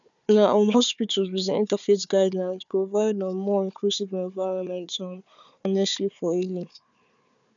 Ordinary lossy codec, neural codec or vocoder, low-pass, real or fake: none; codec, 16 kHz, 4 kbps, FunCodec, trained on Chinese and English, 50 frames a second; 7.2 kHz; fake